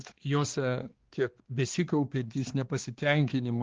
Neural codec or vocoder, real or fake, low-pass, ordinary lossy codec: codec, 16 kHz, 2 kbps, X-Codec, HuBERT features, trained on balanced general audio; fake; 7.2 kHz; Opus, 16 kbps